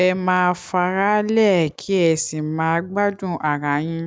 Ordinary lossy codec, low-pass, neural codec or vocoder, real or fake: none; none; none; real